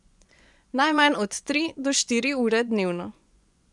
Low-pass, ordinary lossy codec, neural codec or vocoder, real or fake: 10.8 kHz; none; vocoder, 24 kHz, 100 mel bands, Vocos; fake